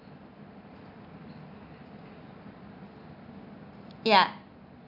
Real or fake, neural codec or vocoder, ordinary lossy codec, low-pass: real; none; none; 5.4 kHz